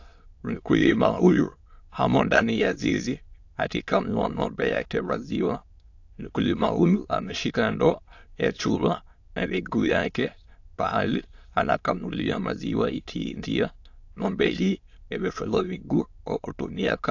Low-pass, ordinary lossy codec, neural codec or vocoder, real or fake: 7.2 kHz; AAC, 48 kbps; autoencoder, 22.05 kHz, a latent of 192 numbers a frame, VITS, trained on many speakers; fake